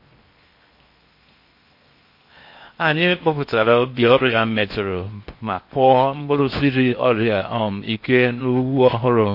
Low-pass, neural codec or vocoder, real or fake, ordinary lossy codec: 5.4 kHz; codec, 16 kHz in and 24 kHz out, 0.8 kbps, FocalCodec, streaming, 65536 codes; fake; MP3, 32 kbps